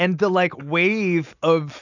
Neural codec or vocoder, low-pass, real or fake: none; 7.2 kHz; real